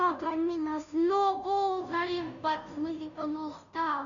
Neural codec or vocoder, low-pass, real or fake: codec, 16 kHz, 0.5 kbps, FunCodec, trained on Chinese and English, 25 frames a second; 7.2 kHz; fake